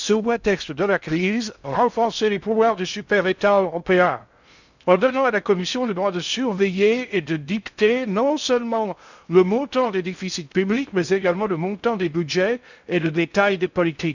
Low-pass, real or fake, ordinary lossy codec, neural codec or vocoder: 7.2 kHz; fake; none; codec, 16 kHz in and 24 kHz out, 0.6 kbps, FocalCodec, streaming, 2048 codes